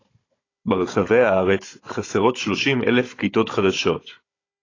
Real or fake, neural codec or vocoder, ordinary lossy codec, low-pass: fake; codec, 16 kHz, 16 kbps, FunCodec, trained on Chinese and English, 50 frames a second; AAC, 32 kbps; 7.2 kHz